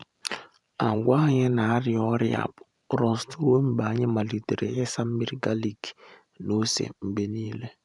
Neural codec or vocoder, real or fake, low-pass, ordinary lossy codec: vocoder, 44.1 kHz, 128 mel bands every 512 samples, BigVGAN v2; fake; 10.8 kHz; none